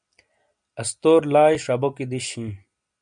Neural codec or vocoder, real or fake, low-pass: none; real; 9.9 kHz